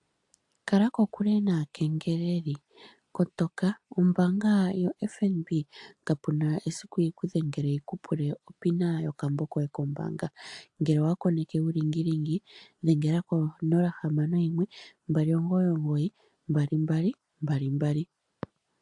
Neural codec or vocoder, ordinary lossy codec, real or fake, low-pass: none; AAC, 64 kbps; real; 10.8 kHz